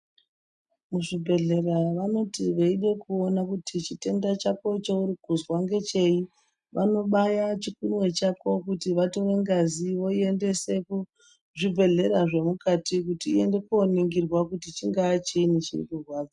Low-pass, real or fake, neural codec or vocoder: 10.8 kHz; real; none